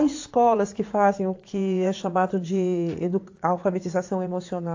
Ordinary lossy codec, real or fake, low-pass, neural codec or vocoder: AAC, 48 kbps; fake; 7.2 kHz; vocoder, 44.1 kHz, 80 mel bands, Vocos